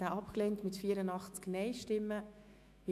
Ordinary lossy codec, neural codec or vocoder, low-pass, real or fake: MP3, 96 kbps; autoencoder, 48 kHz, 128 numbers a frame, DAC-VAE, trained on Japanese speech; 14.4 kHz; fake